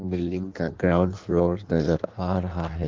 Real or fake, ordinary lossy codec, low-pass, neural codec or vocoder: fake; Opus, 32 kbps; 7.2 kHz; codec, 16 kHz in and 24 kHz out, 1.1 kbps, FireRedTTS-2 codec